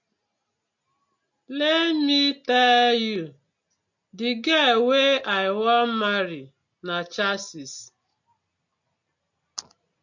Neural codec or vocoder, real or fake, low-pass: none; real; 7.2 kHz